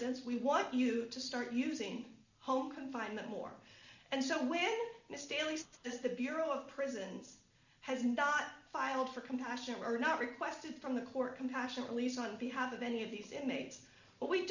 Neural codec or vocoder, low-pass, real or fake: none; 7.2 kHz; real